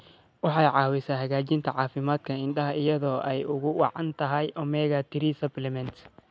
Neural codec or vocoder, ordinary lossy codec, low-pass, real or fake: none; none; none; real